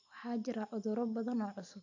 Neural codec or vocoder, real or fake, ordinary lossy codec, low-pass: none; real; AAC, 48 kbps; 7.2 kHz